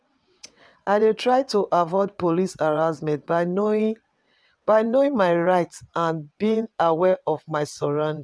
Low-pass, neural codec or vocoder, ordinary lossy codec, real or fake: none; vocoder, 22.05 kHz, 80 mel bands, WaveNeXt; none; fake